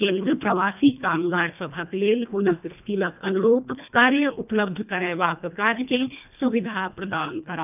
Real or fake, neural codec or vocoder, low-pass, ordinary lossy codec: fake; codec, 24 kHz, 1.5 kbps, HILCodec; 3.6 kHz; none